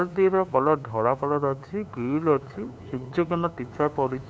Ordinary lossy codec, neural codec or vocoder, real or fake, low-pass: none; codec, 16 kHz, 2 kbps, FunCodec, trained on LibriTTS, 25 frames a second; fake; none